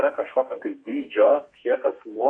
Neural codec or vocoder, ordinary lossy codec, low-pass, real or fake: codec, 32 kHz, 1.9 kbps, SNAC; MP3, 48 kbps; 9.9 kHz; fake